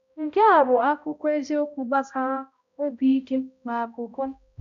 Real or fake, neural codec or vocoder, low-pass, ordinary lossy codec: fake; codec, 16 kHz, 0.5 kbps, X-Codec, HuBERT features, trained on balanced general audio; 7.2 kHz; none